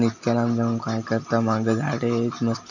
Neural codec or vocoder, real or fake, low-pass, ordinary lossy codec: none; real; 7.2 kHz; none